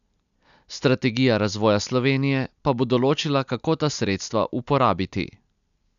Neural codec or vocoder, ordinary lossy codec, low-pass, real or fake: none; none; 7.2 kHz; real